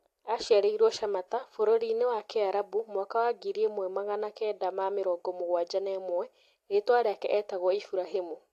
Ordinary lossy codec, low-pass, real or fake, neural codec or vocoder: AAC, 64 kbps; 14.4 kHz; real; none